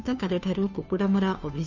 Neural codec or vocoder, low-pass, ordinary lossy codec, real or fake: codec, 16 kHz, 2 kbps, FunCodec, trained on Chinese and English, 25 frames a second; 7.2 kHz; none; fake